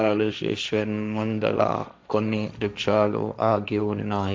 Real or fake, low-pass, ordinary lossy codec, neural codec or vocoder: fake; none; none; codec, 16 kHz, 1.1 kbps, Voila-Tokenizer